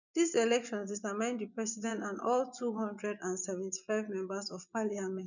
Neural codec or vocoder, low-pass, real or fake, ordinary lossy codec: vocoder, 24 kHz, 100 mel bands, Vocos; 7.2 kHz; fake; none